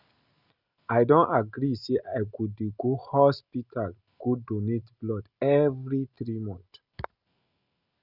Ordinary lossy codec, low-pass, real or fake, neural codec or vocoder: Opus, 64 kbps; 5.4 kHz; real; none